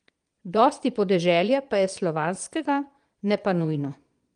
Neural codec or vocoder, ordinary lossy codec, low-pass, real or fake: vocoder, 22.05 kHz, 80 mel bands, Vocos; Opus, 32 kbps; 9.9 kHz; fake